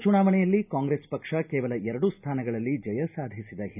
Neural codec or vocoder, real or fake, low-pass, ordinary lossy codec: none; real; 3.6 kHz; none